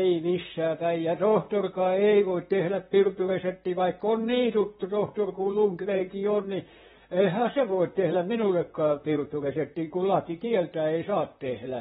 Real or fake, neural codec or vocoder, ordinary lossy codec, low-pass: fake; vocoder, 44.1 kHz, 128 mel bands, Pupu-Vocoder; AAC, 16 kbps; 19.8 kHz